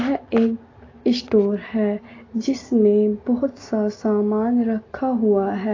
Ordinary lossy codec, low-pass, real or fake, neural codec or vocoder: AAC, 32 kbps; 7.2 kHz; real; none